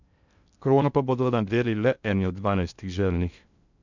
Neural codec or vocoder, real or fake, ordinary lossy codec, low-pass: codec, 16 kHz in and 24 kHz out, 0.6 kbps, FocalCodec, streaming, 2048 codes; fake; none; 7.2 kHz